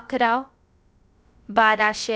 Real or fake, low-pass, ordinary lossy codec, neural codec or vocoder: fake; none; none; codec, 16 kHz, about 1 kbps, DyCAST, with the encoder's durations